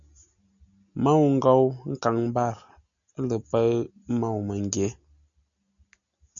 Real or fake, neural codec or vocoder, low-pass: real; none; 7.2 kHz